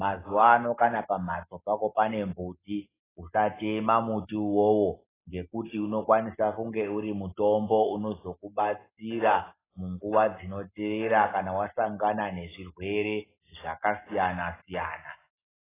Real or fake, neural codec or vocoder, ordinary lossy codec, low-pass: real; none; AAC, 16 kbps; 3.6 kHz